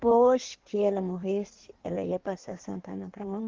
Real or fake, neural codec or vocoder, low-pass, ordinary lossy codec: fake; codec, 16 kHz in and 24 kHz out, 1.1 kbps, FireRedTTS-2 codec; 7.2 kHz; Opus, 16 kbps